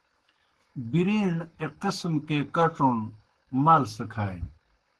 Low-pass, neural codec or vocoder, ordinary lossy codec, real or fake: 10.8 kHz; codec, 44.1 kHz, 7.8 kbps, Pupu-Codec; Opus, 16 kbps; fake